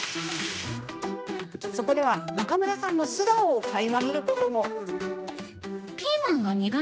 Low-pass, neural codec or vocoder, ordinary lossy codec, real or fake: none; codec, 16 kHz, 1 kbps, X-Codec, HuBERT features, trained on general audio; none; fake